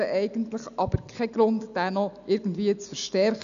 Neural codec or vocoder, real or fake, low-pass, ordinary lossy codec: none; real; 7.2 kHz; none